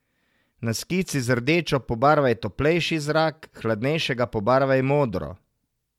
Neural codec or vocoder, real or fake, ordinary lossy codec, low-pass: none; real; MP3, 96 kbps; 19.8 kHz